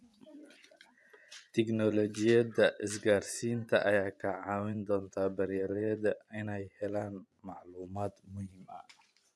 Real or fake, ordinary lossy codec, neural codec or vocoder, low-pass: fake; none; vocoder, 24 kHz, 100 mel bands, Vocos; none